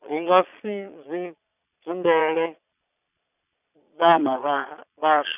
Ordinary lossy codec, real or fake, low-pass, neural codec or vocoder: none; fake; 3.6 kHz; vocoder, 22.05 kHz, 80 mel bands, Vocos